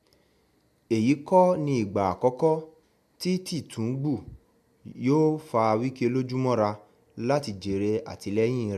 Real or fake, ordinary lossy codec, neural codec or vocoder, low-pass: real; MP3, 96 kbps; none; 14.4 kHz